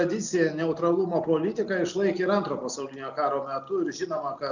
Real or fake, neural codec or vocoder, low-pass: real; none; 7.2 kHz